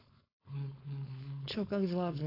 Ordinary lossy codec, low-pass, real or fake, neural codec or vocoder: AAC, 24 kbps; 5.4 kHz; fake; codec, 16 kHz, 4.8 kbps, FACodec